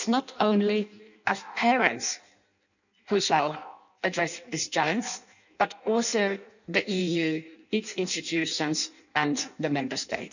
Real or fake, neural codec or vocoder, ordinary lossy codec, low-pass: fake; codec, 16 kHz in and 24 kHz out, 0.6 kbps, FireRedTTS-2 codec; none; 7.2 kHz